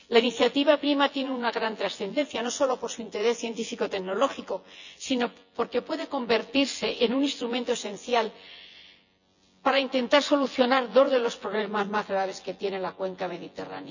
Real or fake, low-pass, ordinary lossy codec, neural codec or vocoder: fake; 7.2 kHz; none; vocoder, 24 kHz, 100 mel bands, Vocos